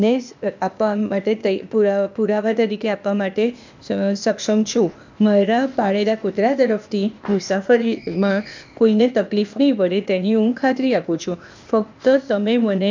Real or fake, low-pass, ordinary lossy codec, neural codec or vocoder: fake; 7.2 kHz; MP3, 64 kbps; codec, 16 kHz, 0.8 kbps, ZipCodec